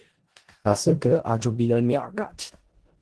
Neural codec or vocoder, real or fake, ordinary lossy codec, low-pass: codec, 16 kHz in and 24 kHz out, 0.4 kbps, LongCat-Audio-Codec, four codebook decoder; fake; Opus, 16 kbps; 10.8 kHz